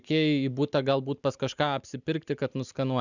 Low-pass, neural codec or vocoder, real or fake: 7.2 kHz; none; real